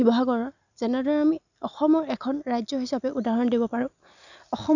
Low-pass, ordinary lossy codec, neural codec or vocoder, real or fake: 7.2 kHz; none; none; real